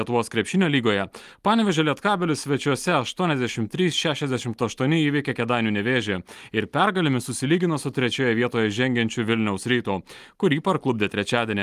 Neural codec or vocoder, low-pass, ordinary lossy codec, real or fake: none; 14.4 kHz; Opus, 32 kbps; real